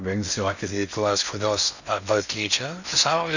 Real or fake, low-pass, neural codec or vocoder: fake; 7.2 kHz; codec, 16 kHz in and 24 kHz out, 0.6 kbps, FocalCodec, streaming, 4096 codes